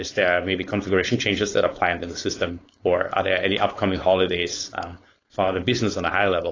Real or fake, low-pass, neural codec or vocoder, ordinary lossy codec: fake; 7.2 kHz; codec, 16 kHz, 4.8 kbps, FACodec; AAC, 32 kbps